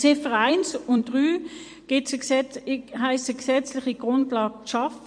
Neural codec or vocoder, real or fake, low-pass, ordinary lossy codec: vocoder, 44.1 kHz, 128 mel bands every 256 samples, BigVGAN v2; fake; 9.9 kHz; MP3, 48 kbps